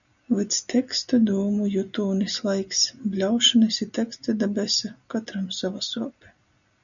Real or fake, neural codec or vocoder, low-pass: real; none; 7.2 kHz